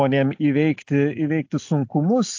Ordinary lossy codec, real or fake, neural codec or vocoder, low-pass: AAC, 48 kbps; real; none; 7.2 kHz